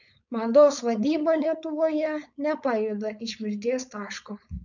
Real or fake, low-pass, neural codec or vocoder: fake; 7.2 kHz; codec, 16 kHz, 4.8 kbps, FACodec